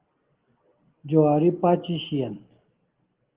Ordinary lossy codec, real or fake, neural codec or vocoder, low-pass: Opus, 16 kbps; real; none; 3.6 kHz